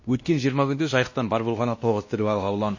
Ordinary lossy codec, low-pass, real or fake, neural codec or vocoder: MP3, 32 kbps; 7.2 kHz; fake; codec, 16 kHz, 1 kbps, X-Codec, WavLM features, trained on Multilingual LibriSpeech